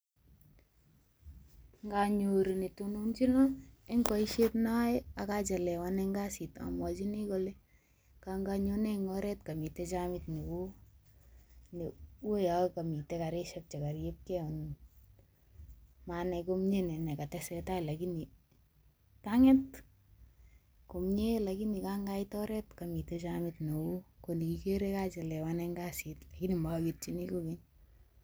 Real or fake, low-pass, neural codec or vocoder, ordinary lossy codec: real; none; none; none